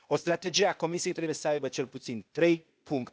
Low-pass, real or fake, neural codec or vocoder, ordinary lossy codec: none; fake; codec, 16 kHz, 0.8 kbps, ZipCodec; none